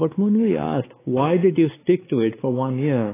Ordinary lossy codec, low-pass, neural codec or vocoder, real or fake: AAC, 16 kbps; 3.6 kHz; codec, 16 kHz, 4 kbps, X-Codec, WavLM features, trained on Multilingual LibriSpeech; fake